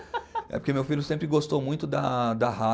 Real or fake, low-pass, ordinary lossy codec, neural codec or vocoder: real; none; none; none